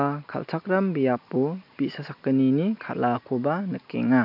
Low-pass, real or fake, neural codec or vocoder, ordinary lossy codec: 5.4 kHz; real; none; MP3, 48 kbps